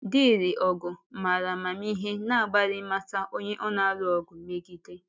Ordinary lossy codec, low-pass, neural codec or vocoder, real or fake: none; none; none; real